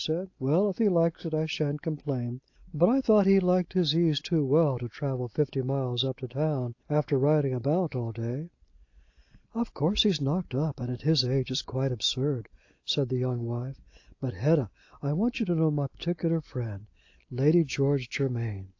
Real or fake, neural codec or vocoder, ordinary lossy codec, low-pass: real; none; AAC, 48 kbps; 7.2 kHz